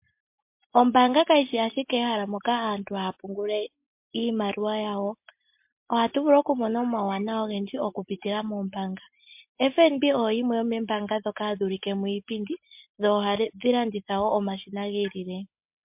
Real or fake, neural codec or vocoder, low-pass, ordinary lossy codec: real; none; 3.6 kHz; MP3, 32 kbps